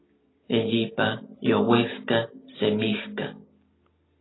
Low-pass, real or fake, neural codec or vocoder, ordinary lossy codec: 7.2 kHz; real; none; AAC, 16 kbps